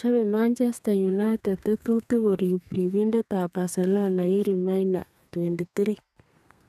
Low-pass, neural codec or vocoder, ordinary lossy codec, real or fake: 14.4 kHz; codec, 32 kHz, 1.9 kbps, SNAC; none; fake